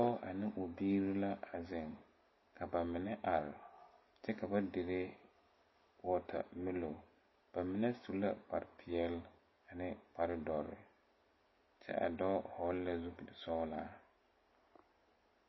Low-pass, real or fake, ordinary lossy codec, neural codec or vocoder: 7.2 kHz; real; MP3, 24 kbps; none